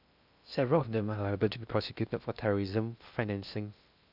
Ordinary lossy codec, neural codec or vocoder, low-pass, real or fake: none; codec, 16 kHz in and 24 kHz out, 0.6 kbps, FocalCodec, streaming, 2048 codes; 5.4 kHz; fake